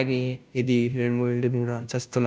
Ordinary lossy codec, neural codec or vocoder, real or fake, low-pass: none; codec, 16 kHz, 0.5 kbps, FunCodec, trained on Chinese and English, 25 frames a second; fake; none